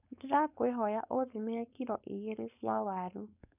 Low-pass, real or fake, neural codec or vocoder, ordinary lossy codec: 3.6 kHz; fake; codec, 16 kHz, 4.8 kbps, FACodec; none